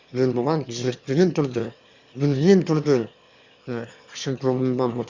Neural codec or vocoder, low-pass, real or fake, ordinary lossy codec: autoencoder, 22.05 kHz, a latent of 192 numbers a frame, VITS, trained on one speaker; 7.2 kHz; fake; Opus, 64 kbps